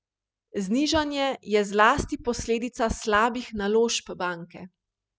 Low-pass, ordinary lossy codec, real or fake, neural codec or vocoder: none; none; real; none